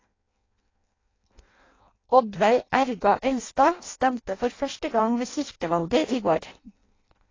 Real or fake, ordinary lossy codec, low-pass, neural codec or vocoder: fake; AAC, 32 kbps; 7.2 kHz; codec, 16 kHz in and 24 kHz out, 0.6 kbps, FireRedTTS-2 codec